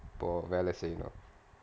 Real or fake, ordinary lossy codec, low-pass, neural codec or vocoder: real; none; none; none